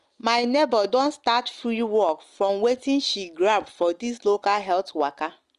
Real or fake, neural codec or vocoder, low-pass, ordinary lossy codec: real; none; 10.8 kHz; Opus, 32 kbps